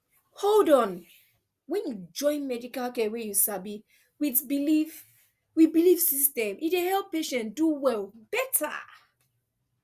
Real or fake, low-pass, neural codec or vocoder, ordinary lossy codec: real; 14.4 kHz; none; Opus, 64 kbps